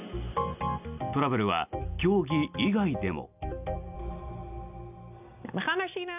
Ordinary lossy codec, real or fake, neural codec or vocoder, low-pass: none; real; none; 3.6 kHz